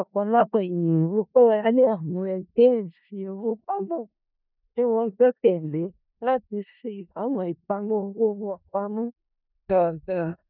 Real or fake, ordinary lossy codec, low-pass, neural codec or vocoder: fake; none; 5.4 kHz; codec, 16 kHz in and 24 kHz out, 0.4 kbps, LongCat-Audio-Codec, four codebook decoder